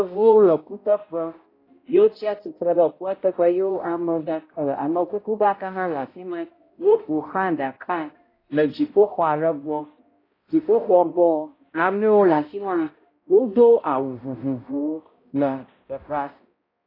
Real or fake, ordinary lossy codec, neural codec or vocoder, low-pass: fake; AAC, 24 kbps; codec, 16 kHz, 0.5 kbps, X-Codec, HuBERT features, trained on balanced general audio; 5.4 kHz